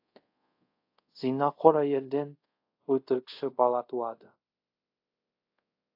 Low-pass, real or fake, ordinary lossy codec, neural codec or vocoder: 5.4 kHz; fake; AAC, 32 kbps; codec, 24 kHz, 0.5 kbps, DualCodec